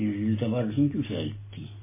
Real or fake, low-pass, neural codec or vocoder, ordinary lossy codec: real; 3.6 kHz; none; MP3, 16 kbps